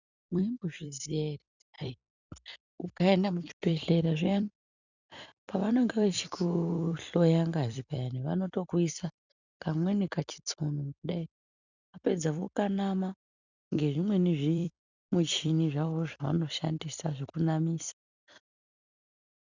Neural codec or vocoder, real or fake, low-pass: vocoder, 44.1 kHz, 128 mel bands every 256 samples, BigVGAN v2; fake; 7.2 kHz